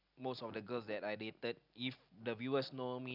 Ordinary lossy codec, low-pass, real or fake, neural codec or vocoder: none; 5.4 kHz; real; none